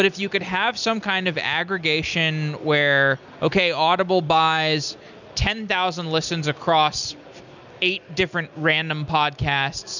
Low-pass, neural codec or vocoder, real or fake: 7.2 kHz; none; real